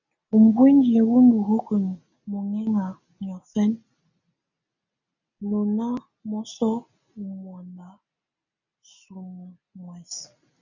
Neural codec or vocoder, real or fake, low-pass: none; real; 7.2 kHz